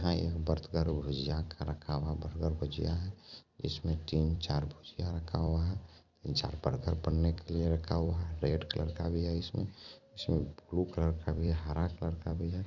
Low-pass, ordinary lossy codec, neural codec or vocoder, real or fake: 7.2 kHz; none; vocoder, 44.1 kHz, 128 mel bands every 256 samples, BigVGAN v2; fake